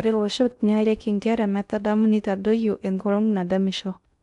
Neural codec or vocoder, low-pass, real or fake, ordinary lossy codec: codec, 16 kHz in and 24 kHz out, 0.6 kbps, FocalCodec, streaming, 2048 codes; 10.8 kHz; fake; none